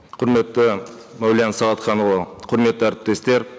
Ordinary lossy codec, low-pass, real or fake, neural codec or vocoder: none; none; real; none